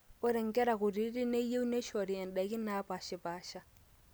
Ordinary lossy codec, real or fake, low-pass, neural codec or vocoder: none; real; none; none